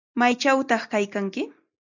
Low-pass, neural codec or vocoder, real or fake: 7.2 kHz; none; real